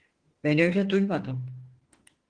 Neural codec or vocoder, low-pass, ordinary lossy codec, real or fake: autoencoder, 48 kHz, 32 numbers a frame, DAC-VAE, trained on Japanese speech; 9.9 kHz; Opus, 16 kbps; fake